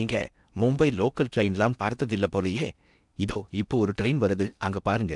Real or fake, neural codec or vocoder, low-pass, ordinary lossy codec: fake; codec, 16 kHz in and 24 kHz out, 0.8 kbps, FocalCodec, streaming, 65536 codes; 10.8 kHz; none